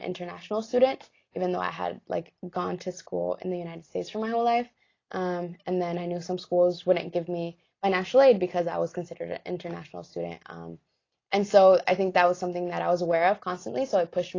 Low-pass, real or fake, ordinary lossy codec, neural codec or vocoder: 7.2 kHz; real; AAC, 32 kbps; none